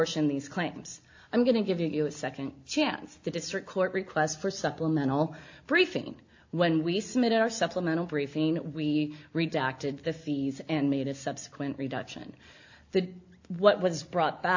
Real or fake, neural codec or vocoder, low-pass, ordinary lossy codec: real; none; 7.2 kHz; AAC, 48 kbps